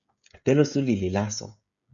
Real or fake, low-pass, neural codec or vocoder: fake; 7.2 kHz; codec, 16 kHz, 16 kbps, FreqCodec, smaller model